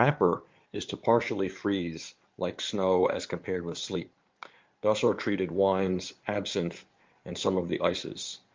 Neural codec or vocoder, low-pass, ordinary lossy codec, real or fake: codec, 16 kHz in and 24 kHz out, 2.2 kbps, FireRedTTS-2 codec; 7.2 kHz; Opus, 24 kbps; fake